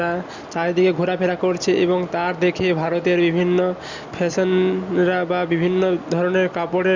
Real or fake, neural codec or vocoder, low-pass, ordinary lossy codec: real; none; 7.2 kHz; Opus, 64 kbps